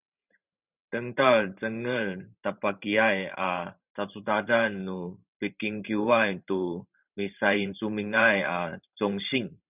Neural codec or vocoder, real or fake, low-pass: vocoder, 44.1 kHz, 128 mel bands every 512 samples, BigVGAN v2; fake; 3.6 kHz